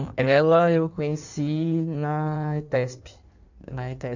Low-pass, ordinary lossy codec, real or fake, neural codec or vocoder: 7.2 kHz; none; fake; codec, 16 kHz in and 24 kHz out, 1.1 kbps, FireRedTTS-2 codec